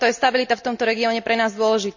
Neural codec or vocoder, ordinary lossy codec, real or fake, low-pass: none; none; real; 7.2 kHz